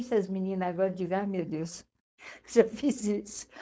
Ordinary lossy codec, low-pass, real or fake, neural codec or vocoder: none; none; fake; codec, 16 kHz, 4.8 kbps, FACodec